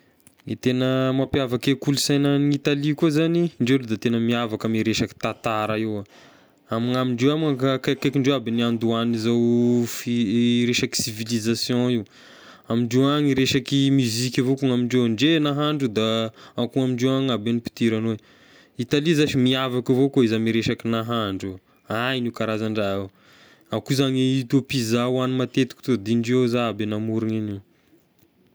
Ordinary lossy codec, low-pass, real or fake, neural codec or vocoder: none; none; real; none